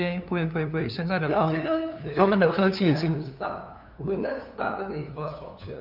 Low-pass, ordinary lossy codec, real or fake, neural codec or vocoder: 5.4 kHz; none; fake; codec, 16 kHz, 2 kbps, FunCodec, trained on LibriTTS, 25 frames a second